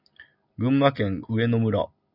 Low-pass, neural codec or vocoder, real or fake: 5.4 kHz; none; real